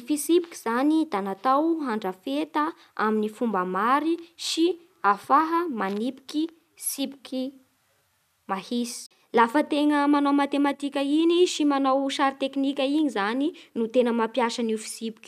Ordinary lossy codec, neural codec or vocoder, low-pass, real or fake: none; none; 14.4 kHz; real